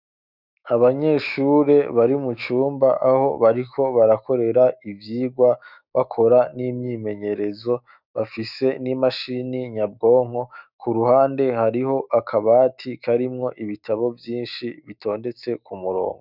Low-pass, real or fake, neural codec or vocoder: 5.4 kHz; fake; autoencoder, 48 kHz, 128 numbers a frame, DAC-VAE, trained on Japanese speech